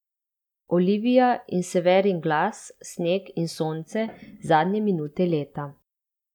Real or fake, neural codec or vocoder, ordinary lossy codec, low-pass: real; none; none; 19.8 kHz